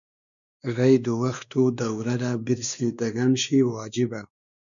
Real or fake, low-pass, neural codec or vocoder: fake; 7.2 kHz; codec, 16 kHz, 2 kbps, X-Codec, WavLM features, trained on Multilingual LibriSpeech